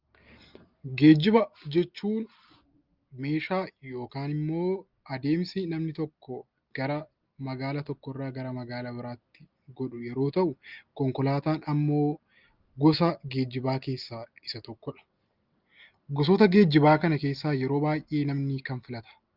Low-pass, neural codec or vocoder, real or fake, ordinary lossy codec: 5.4 kHz; none; real; Opus, 32 kbps